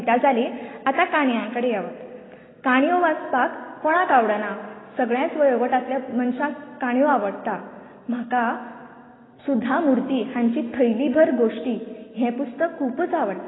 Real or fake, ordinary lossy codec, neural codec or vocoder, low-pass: real; AAC, 16 kbps; none; 7.2 kHz